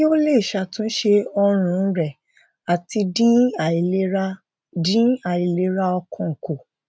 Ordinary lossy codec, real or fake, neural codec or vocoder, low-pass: none; real; none; none